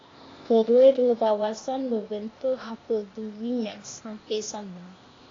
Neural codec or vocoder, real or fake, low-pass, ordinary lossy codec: codec, 16 kHz, 0.8 kbps, ZipCodec; fake; 7.2 kHz; AAC, 32 kbps